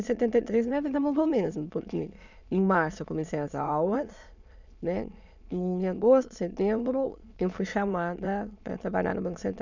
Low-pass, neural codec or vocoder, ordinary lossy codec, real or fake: 7.2 kHz; autoencoder, 22.05 kHz, a latent of 192 numbers a frame, VITS, trained on many speakers; none; fake